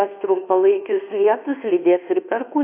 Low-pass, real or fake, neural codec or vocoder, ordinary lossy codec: 3.6 kHz; fake; codec, 24 kHz, 1.2 kbps, DualCodec; AAC, 32 kbps